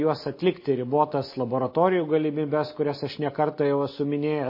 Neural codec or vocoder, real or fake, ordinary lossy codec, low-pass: none; real; MP3, 24 kbps; 5.4 kHz